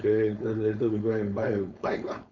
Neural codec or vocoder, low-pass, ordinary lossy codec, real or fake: codec, 16 kHz, 4.8 kbps, FACodec; 7.2 kHz; none; fake